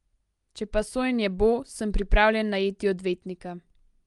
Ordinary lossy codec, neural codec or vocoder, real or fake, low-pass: Opus, 32 kbps; none; real; 10.8 kHz